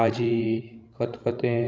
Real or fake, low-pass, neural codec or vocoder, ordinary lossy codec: fake; none; codec, 16 kHz, 16 kbps, FreqCodec, larger model; none